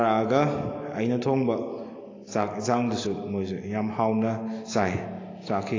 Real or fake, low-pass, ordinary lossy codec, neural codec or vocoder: real; 7.2 kHz; AAC, 32 kbps; none